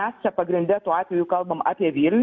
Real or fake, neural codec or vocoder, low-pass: real; none; 7.2 kHz